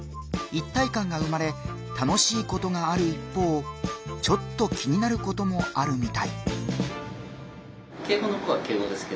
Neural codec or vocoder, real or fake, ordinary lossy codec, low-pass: none; real; none; none